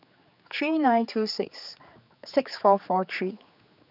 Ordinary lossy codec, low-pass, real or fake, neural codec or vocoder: none; 5.4 kHz; fake; codec, 16 kHz, 4 kbps, X-Codec, HuBERT features, trained on general audio